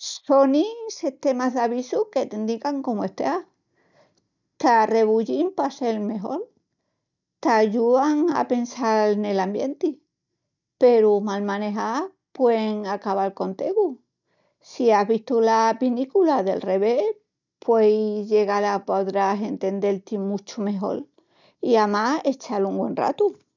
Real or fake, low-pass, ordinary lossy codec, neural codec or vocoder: real; 7.2 kHz; none; none